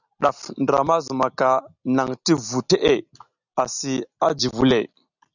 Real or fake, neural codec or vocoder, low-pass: real; none; 7.2 kHz